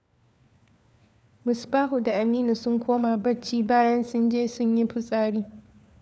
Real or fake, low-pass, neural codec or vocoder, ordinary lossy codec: fake; none; codec, 16 kHz, 4 kbps, FunCodec, trained on LibriTTS, 50 frames a second; none